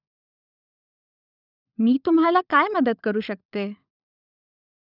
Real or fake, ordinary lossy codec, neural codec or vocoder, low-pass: fake; none; codec, 16 kHz, 16 kbps, FunCodec, trained on LibriTTS, 50 frames a second; 5.4 kHz